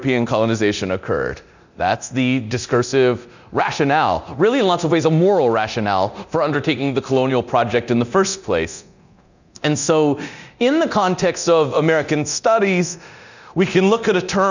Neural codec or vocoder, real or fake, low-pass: codec, 24 kHz, 0.9 kbps, DualCodec; fake; 7.2 kHz